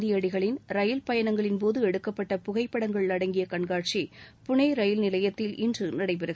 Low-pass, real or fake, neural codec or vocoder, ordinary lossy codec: none; real; none; none